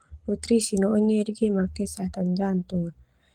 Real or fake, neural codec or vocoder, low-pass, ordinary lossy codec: fake; codec, 44.1 kHz, 7.8 kbps, Pupu-Codec; 19.8 kHz; Opus, 24 kbps